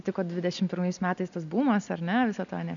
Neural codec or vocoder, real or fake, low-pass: none; real; 7.2 kHz